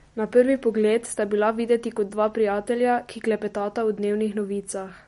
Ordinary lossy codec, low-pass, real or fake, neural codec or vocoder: MP3, 48 kbps; 19.8 kHz; real; none